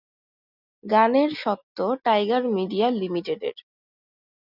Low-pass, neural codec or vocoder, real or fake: 5.4 kHz; none; real